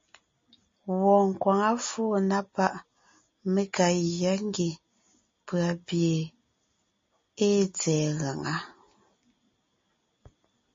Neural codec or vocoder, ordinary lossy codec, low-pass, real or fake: none; MP3, 32 kbps; 7.2 kHz; real